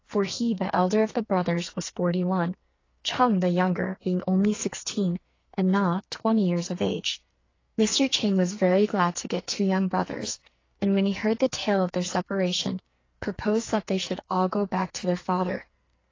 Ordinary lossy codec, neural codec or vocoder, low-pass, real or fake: AAC, 32 kbps; codec, 44.1 kHz, 2.6 kbps, SNAC; 7.2 kHz; fake